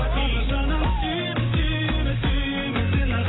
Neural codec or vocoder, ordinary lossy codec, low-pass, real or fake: none; AAC, 16 kbps; 7.2 kHz; real